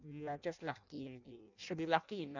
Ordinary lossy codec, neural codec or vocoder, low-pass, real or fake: none; codec, 16 kHz in and 24 kHz out, 0.6 kbps, FireRedTTS-2 codec; 7.2 kHz; fake